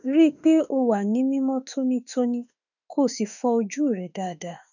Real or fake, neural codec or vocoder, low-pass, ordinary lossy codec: fake; autoencoder, 48 kHz, 32 numbers a frame, DAC-VAE, trained on Japanese speech; 7.2 kHz; none